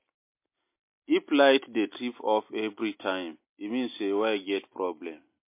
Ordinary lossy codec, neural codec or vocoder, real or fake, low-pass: MP3, 24 kbps; none; real; 3.6 kHz